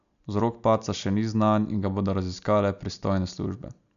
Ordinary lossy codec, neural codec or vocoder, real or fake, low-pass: none; none; real; 7.2 kHz